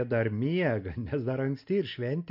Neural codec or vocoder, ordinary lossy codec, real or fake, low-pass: none; MP3, 48 kbps; real; 5.4 kHz